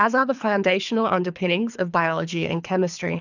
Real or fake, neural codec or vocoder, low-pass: fake; codec, 24 kHz, 3 kbps, HILCodec; 7.2 kHz